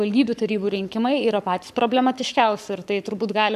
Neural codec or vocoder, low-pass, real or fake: codec, 44.1 kHz, 7.8 kbps, Pupu-Codec; 14.4 kHz; fake